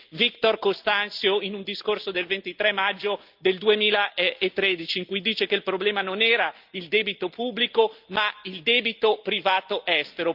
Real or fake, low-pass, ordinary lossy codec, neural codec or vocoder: real; 5.4 kHz; Opus, 24 kbps; none